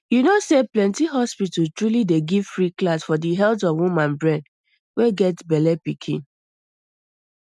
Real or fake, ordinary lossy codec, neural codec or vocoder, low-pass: real; none; none; none